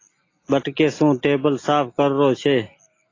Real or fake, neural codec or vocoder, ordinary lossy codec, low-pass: real; none; AAC, 32 kbps; 7.2 kHz